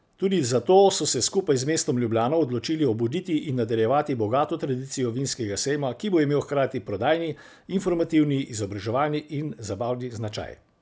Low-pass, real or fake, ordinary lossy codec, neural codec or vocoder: none; real; none; none